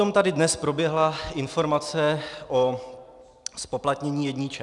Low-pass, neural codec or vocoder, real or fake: 10.8 kHz; none; real